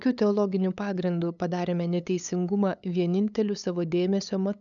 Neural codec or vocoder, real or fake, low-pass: codec, 16 kHz, 8 kbps, FunCodec, trained on LibriTTS, 25 frames a second; fake; 7.2 kHz